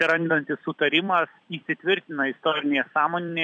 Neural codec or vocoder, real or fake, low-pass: vocoder, 44.1 kHz, 128 mel bands every 256 samples, BigVGAN v2; fake; 9.9 kHz